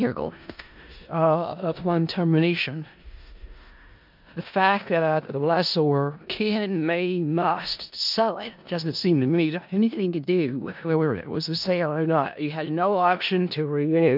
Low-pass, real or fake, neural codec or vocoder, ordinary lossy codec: 5.4 kHz; fake; codec, 16 kHz in and 24 kHz out, 0.4 kbps, LongCat-Audio-Codec, four codebook decoder; MP3, 48 kbps